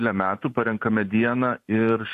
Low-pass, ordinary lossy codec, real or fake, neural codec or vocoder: 14.4 kHz; AAC, 64 kbps; fake; vocoder, 44.1 kHz, 128 mel bands every 512 samples, BigVGAN v2